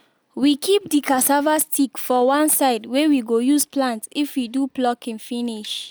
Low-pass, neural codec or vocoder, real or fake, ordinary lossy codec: none; none; real; none